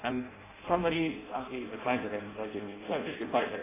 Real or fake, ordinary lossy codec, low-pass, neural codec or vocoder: fake; AAC, 16 kbps; 3.6 kHz; codec, 16 kHz in and 24 kHz out, 0.6 kbps, FireRedTTS-2 codec